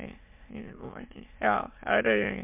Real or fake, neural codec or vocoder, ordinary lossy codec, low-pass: fake; autoencoder, 22.05 kHz, a latent of 192 numbers a frame, VITS, trained on many speakers; MP3, 32 kbps; 3.6 kHz